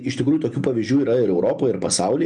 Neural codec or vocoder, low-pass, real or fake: none; 10.8 kHz; real